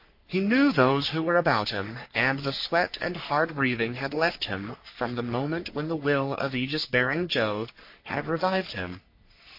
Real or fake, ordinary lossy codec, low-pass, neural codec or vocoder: fake; MP3, 32 kbps; 5.4 kHz; codec, 44.1 kHz, 3.4 kbps, Pupu-Codec